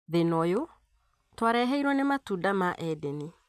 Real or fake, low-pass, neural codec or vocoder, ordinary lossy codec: real; 14.4 kHz; none; none